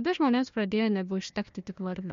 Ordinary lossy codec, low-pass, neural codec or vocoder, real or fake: MP3, 48 kbps; 7.2 kHz; codec, 16 kHz, 1 kbps, FunCodec, trained on Chinese and English, 50 frames a second; fake